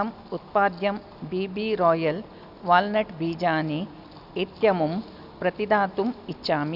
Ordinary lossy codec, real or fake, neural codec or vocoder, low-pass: none; real; none; 5.4 kHz